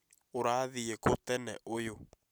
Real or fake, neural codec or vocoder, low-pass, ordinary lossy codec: real; none; none; none